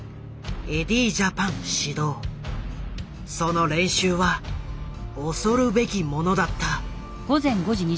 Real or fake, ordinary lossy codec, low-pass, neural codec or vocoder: real; none; none; none